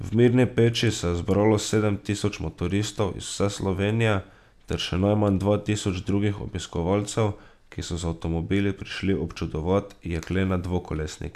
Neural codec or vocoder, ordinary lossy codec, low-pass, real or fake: none; none; 14.4 kHz; real